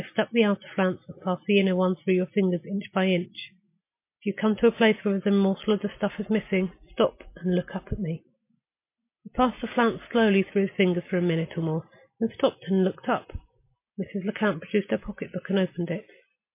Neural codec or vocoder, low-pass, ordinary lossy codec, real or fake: none; 3.6 kHz; MP3, 24 kbps; real